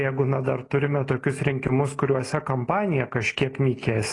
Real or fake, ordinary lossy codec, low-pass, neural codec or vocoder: real; AAC, 32 kbps; 10.8 kHz; none